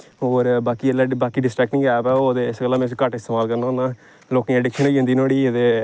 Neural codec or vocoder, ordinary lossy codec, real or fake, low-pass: none; none; real; none